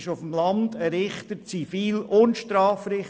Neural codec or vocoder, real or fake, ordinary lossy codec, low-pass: none; real; none; none